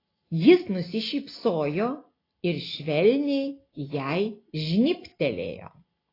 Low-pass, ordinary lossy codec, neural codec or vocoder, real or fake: 5.4 kHz; AAC, 24 kbps; none; real